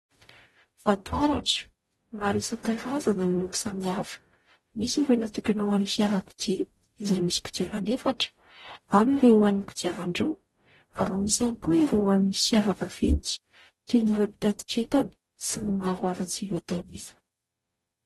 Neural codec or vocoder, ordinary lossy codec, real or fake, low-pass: codec, 44.1 kHz, 0.9 kbps, DAC; AAC, 32 kbps; fake; 19.8 kHz